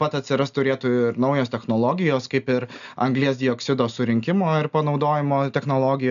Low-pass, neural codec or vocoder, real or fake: 7.2 kHz; none; real